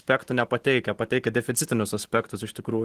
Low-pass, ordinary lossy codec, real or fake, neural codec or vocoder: 14.4 kHz; Opus, 16 kbps; real; none